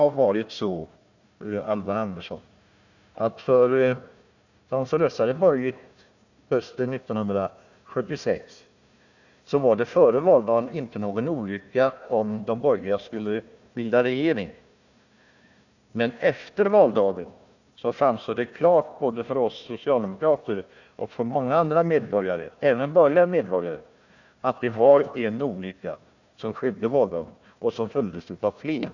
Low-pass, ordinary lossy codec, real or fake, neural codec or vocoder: 7.2 kHz; none; fake; codec, 16 kHz, 1 kbps, FunCodec, trained on Chinese and English, 50 frames a second